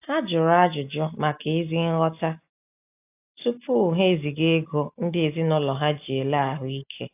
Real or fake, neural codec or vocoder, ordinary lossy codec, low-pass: real; none; AAC, 32 kbps; 3.6 kHz